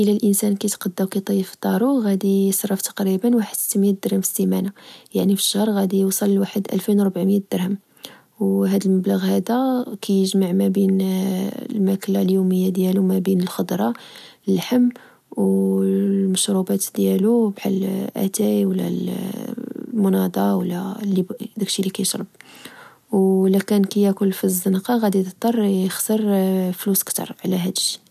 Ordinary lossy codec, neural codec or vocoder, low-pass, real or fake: none; none; 14.4 kHz; real